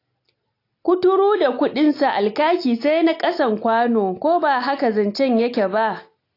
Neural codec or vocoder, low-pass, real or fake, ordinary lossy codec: none; 5.4 kHz; real; AAC, 32 kbps